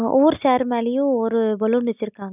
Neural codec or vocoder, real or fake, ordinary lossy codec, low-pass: none; real; none; 3.6 kHz